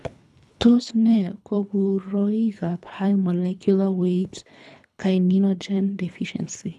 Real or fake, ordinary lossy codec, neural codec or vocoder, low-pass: fake; none; codec, 24 kHz, 3 kbps, HILCodec; none